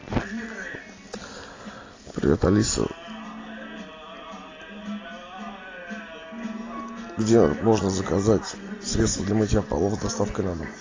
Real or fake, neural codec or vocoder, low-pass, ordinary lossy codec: real; none; 7.2 kHz; AAC, 32 kbps